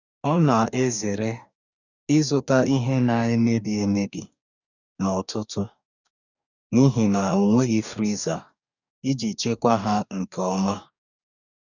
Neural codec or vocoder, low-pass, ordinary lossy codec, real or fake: codec, 44.1 kHz, 2.6 kbps, DAC; 7.2 kHz; none; fake